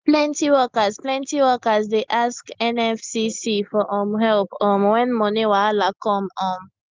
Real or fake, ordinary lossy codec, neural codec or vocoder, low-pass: real; Opus, 24 kbps; none; 7.2 kHz